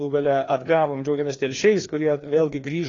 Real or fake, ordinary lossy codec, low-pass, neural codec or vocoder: fake; AAC, 32 kbps; 7.2 kHz; codec, 16 kHz, 2 kbps, FreqCodec, larger model